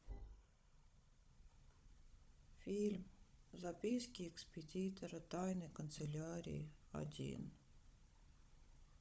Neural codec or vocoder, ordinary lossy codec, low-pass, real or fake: codec, 16 kHz, 16 kbps, FunCodec, trained on Chinese and English, 50 frames a second; none; none; fake